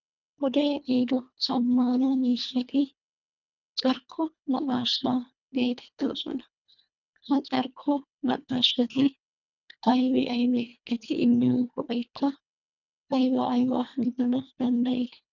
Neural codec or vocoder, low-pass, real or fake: codec, 24 kHz, 1.5 kbps, HILCodec; 7.2 kHz; fake